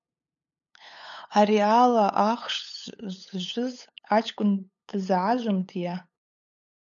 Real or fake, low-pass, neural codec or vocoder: fake; 7.2 kHz; codec, 16 kHz, 8 kbps, FunCodec, trained on LibriTTS, 25 frames a second